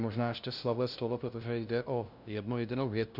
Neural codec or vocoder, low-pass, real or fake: codec, 16 kHz, 0.5 kbps, FunCodec, trained on LibriTTS, 25 frames a second; 5.4 kHz; fake